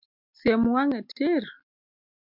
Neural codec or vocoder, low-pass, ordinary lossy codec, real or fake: none; 5.4 kHz; MP3, 48 kbps; real